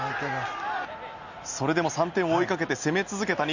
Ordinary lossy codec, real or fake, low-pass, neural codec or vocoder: Opus, 64 kbps; real; 7.2 kHz; none